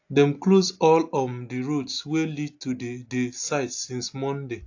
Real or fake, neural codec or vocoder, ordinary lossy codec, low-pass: real; none; AAC, 48 kbps; 7.2 kHz